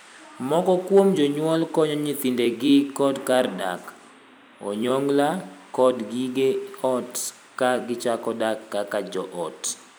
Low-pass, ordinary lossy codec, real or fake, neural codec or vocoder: none; none; fake; vocoder, 44.1 kHz, 128 mel bands every 256 samples, BigVGAN v2